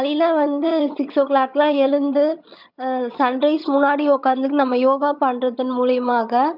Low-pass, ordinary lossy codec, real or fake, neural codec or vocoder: 5.4 kHz; none; fake; vocoder, 22.05 kHz, 80 mel bands, HiFi-GAN